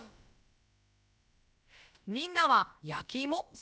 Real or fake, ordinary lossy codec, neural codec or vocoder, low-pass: fake; none; codec, 16 kHz, about 1 kbps, DyCAST, with the encoder's durations; none